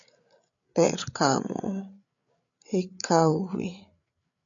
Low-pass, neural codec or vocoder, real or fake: 7.2 kHz; codec, 16 kHz, 8 kbps, FreqCodec, larger model; fake